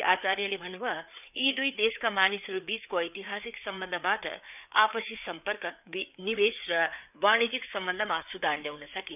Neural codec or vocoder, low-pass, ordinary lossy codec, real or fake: codec, 16 kHz, 4 kbps, FreqCodec, larger model; 3.6 kHz; none; fake